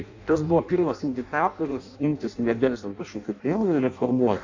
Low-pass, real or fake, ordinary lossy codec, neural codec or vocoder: 7.2 kHz; fake; AAC, 48 kbps; codec, 16 kHz in and 24 kHz out, 0.6 kbps, FireRedTTS-2 codec